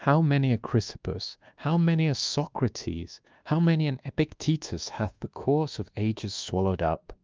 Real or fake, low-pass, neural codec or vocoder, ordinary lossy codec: fake; 7.2 kHz; codec, 24 kHz, 1.2 kbps, DualCodec; Opus, 32 kbps